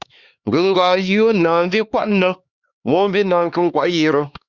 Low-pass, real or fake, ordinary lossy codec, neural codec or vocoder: 7.2 kHz; fake; Opus, 64 kbps; codec, 16 kHz, 2 kbps, X-Codec, HuBERT features, trained on LibriSpeech